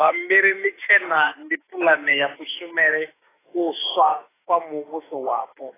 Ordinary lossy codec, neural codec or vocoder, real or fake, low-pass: AAC, 16 kbps; autoencoder, 48 kHz, 32 numbers a frame, DAC-VAE, trained on Japanese speech; fake; 3.6 kHz